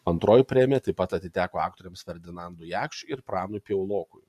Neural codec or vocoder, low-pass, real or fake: none; 14.4 kHz; real